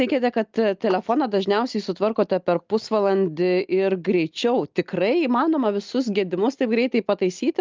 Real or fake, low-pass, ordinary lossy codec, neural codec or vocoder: real; 7.2 kHz; Opus, 24 kbps; none